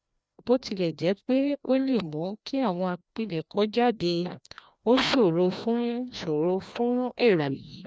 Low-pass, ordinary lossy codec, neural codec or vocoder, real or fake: none; none; codec, 16 kHz, 1 kbps, FreqCodec, larger model; fake